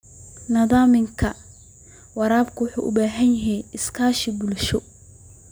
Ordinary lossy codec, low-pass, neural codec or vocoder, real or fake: none; none; none; real